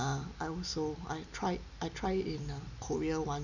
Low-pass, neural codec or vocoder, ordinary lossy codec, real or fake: 7.2 kHz; none; none; real